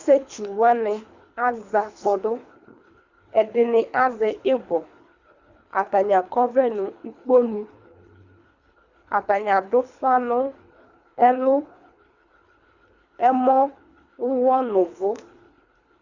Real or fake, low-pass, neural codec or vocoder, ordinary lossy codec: fake; 7.2 kHz; codec, 24 kHz, 3 kbps, HILCodec; Opus, 64 kbps